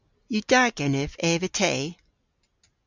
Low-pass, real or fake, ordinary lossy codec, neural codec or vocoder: 7.2 kHz; real; Opus, 64 kbps; none